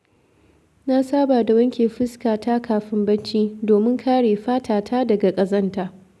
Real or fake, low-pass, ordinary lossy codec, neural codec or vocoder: real; none; none; none